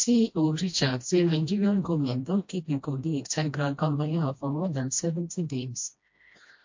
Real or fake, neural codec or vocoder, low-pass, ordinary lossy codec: fake; codec, 16 kHz, 1 kbps, FreqCodec, smaller model; 7.2 kHz; MP3, 48 kbps